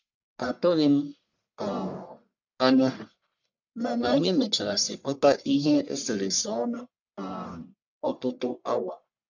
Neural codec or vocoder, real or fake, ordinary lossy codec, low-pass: codec, 44.1 kHz, 1.7 kbps, Pupu-Codec; fake; none; 7.2 kHz